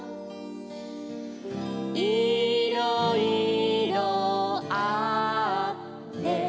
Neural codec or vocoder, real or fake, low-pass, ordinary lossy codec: none; real; none; none